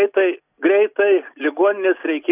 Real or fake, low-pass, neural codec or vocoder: real; 3.6 kHz; none